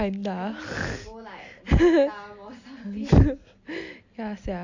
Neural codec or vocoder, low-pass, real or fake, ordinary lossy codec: none; 7.2 kHz; real; AAC, 48 kbps